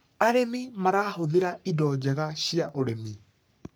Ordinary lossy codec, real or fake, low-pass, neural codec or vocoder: none; fake; none; codec, 44.1 kHz, 3.4 kbps, Pupu-Codec